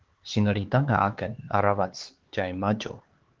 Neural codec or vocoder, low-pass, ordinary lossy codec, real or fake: codec, 16 kHz, 4 kbps, X-Codec, HuBERT features, trained on LibriSpeech; 7.2 kHz; Opus, 16 kbps; fake